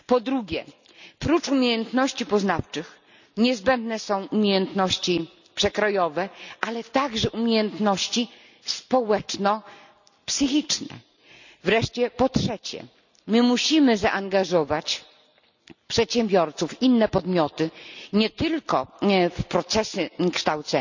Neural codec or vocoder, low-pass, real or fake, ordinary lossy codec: none; 7.2 kHz; real; none